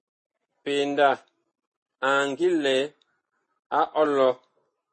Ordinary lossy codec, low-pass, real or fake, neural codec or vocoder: MP3, 32 kbps; 9.9 kHz; real; none